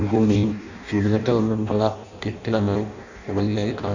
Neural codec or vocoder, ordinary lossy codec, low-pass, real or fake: codec, 16 kHz in and 24 kHz out, 0.6 kbps, FireRedTTS-2 codec; none; 7.2 kHz; fake